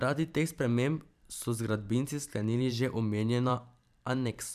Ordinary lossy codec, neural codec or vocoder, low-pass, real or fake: none; vocoder, 44.1 kHz, 128 mel bands every 512 samples, BigVGAN v2; 14.4 kHz; fake